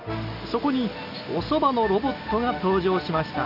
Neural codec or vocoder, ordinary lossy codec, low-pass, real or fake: none; none; 5.4 kHz; real